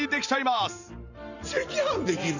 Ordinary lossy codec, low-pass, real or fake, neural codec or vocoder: none; 7.2 kHz; fake; vocoder, 44.1 kHz, 128 mel bands every 512 samples, BigVGAN v2